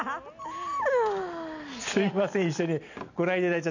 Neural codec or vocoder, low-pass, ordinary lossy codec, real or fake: none; 7.2 kHz; none; real